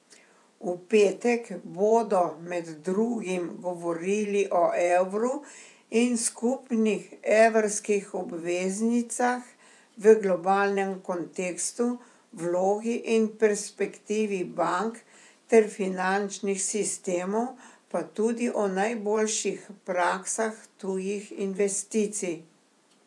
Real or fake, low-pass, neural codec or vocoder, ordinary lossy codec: fake; none; vocoder, 24 kHz, 100 mel bands, Vocos; none